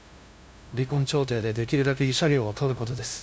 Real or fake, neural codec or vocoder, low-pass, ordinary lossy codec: fake; codec, 16 kHz, 0.5 kbps, FunCodec, trained on LibriTTS, 25 frames a second; none; none